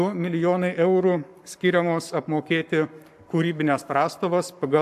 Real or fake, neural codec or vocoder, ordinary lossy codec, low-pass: fake; codec, 44.1 kHz, 7.8 kbps, Pupu-Codec; AAC, 96 kbps; 14.4 kHz